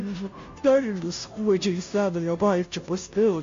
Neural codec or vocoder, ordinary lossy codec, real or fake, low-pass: codec, 16 kHz, 0.5 kbps, FunCodec, trained on Chinese and English, 25 frames a second; MP3, 48 kbps; fake; 7.2 kHz